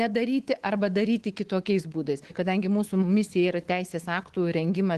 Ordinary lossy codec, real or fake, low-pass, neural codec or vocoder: Opus, 16 kbps; fake; 10.8 kHz; codec, 24 kHz, 3.1 kbps, DualCodec